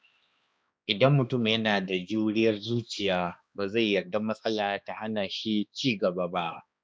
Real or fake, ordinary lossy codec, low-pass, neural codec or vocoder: fake; none; none; codec, 16 kHz, 2 kbps, X-Codec, HuBERT features, trained on balanced general audio